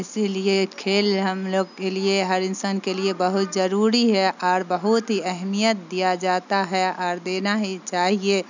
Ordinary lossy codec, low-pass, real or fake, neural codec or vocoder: none; 7.2 kHz; real; none